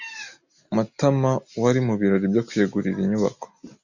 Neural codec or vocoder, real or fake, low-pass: none; real; 7.2 kHz